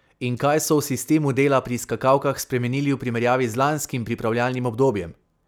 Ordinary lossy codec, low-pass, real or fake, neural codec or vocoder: none; none; real; none